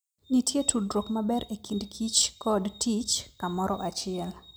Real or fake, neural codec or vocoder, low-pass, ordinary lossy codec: real; none; none; none